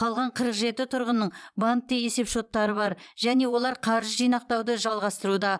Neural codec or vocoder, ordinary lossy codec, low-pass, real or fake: vocoder, 22.05 kHz, 80 mel bands, Vocos; none; none; fake